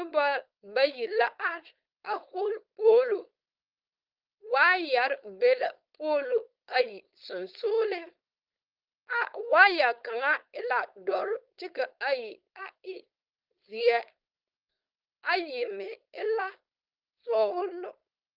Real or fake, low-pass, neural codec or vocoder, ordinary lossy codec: fake; 5.4 kHz; codec, 16 kHz, 4.8 kbps, FACodec; Opus, 32 kbps